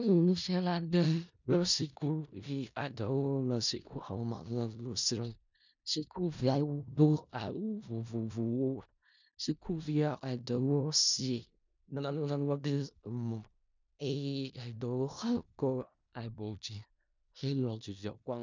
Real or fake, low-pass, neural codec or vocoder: fake; 7.2 kHz; codec, 16 kHz in and 24 kHz out, 0.4 kbps, LongCat-Audio-Codec, four codebook decoder